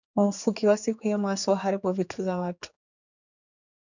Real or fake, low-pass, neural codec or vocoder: fake; 7.2 kHz; codec, 16 kHz, 4 kbps, X-Codec, HuBERT features, trained on general audio